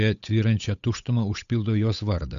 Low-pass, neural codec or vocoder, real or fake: 7.2 kHz; codec, 16 kHz, 8 kbps, FunCodec, trained on Chinese and English, 25 frames a second; fake